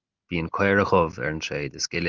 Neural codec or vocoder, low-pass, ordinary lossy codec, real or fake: none; 7.2 kHz; Opus, 32 kbps; real